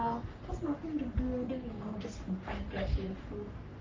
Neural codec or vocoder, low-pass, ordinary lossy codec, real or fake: codec, 44.1 kHz, 3.4 kbps, Pupu-Codec; 7.2 kHz; Opus, 32 kbps; fake